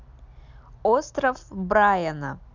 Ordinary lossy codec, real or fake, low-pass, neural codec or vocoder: none; real; 7.2 kHz; none